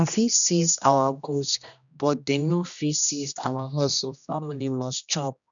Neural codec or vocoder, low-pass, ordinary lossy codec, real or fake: codec, 16 kHz, 1 kbps, X-Codec, HuBERT features, trained on general audio; 7.2 kHz; none; fake